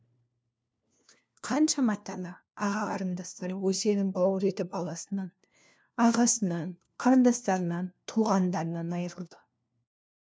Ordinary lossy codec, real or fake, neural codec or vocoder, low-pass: none; fake; codec, 16 kHz, 1 kbps, FunCodec, trained on LibriTTS, 50 frames a second; none